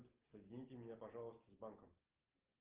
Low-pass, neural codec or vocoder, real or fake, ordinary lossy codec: 3.6 kHz; none; real; Opus, 16 kbps